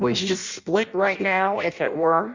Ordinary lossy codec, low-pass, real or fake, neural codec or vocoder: Opus, 64 kbps; 7.2 kHz; fake; codec, 16 kHz in and 24 kHz out, 0.6 kbps, FireRedTTS-2 codec